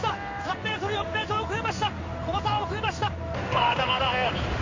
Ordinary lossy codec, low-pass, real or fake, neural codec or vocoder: MP3, 32 kbps; 7.2 kHz; fake; codec, 16 kHz in and 24 kHz out, 1 kbps, XY-Tokenizer